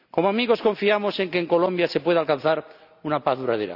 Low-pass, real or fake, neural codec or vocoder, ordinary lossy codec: 5.4 kHz; real; none; none